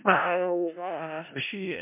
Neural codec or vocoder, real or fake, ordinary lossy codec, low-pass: codec, 16 kHz in and 24 kHz out, 0.4 kbps, LongCat-Audio-Codec, four codebook decoder; fake; MP3, 32 kbps; 3.6 kHz